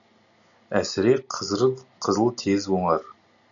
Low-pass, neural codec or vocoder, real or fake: 7.2 kHz; none; real